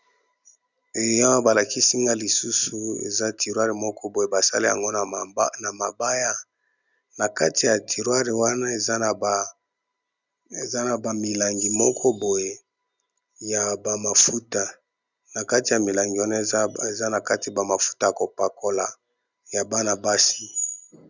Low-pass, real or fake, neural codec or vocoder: 7.2 kHz; real; none